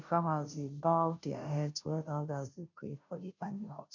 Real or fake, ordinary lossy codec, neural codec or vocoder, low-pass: fake; none; codec, 16 kHz, 0.5 kbps, FunCodec, trained on Chinese and English, 25 frames a second; 7.2 kHz